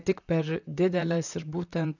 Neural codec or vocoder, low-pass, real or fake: vocoder, 44.1 kHz, 128 mel bands, Pupu-Vocoder; 7.2 kHz; fake